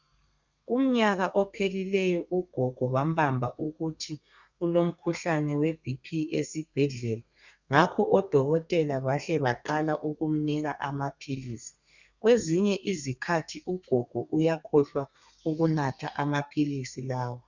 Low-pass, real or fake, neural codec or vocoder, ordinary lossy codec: 7.2 kHz; fake; codec, 32 kHz, 1.9 kbps, SNAC; Opus, 64 kbps